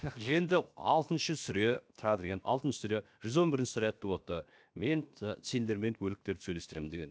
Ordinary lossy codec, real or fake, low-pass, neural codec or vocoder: none; fake; none; codec, 16 kHz, 0.7 kbps, FocalCodec